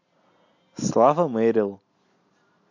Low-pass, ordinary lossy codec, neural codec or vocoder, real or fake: 7.2 kHz; AAC, 48 kbps; none; real